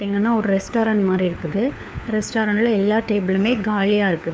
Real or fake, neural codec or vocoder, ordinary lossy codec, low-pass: fake; codec, 16 kHz, 4 kbps, FunCodec, trained on LibriTTS, 50 frames a second; none; none